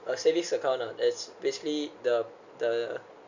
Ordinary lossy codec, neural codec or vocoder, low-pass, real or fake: none; none; 7.2 kHz; real